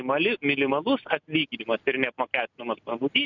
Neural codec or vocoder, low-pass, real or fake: none; 7.2 kHz; real